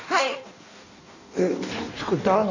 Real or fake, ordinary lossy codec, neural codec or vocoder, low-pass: fake; Opus, 64 kbps; codec, 16 kHz in and 24 kHz out, 1.1 kbps, FireRedTTS-2 codec; 7.2 kHz